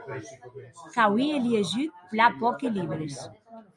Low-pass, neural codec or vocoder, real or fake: 10.8 kHz; none; real